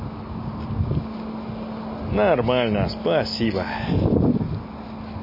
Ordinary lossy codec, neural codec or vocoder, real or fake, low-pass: MP3, 24 kbps; none; real; 5.4 kHz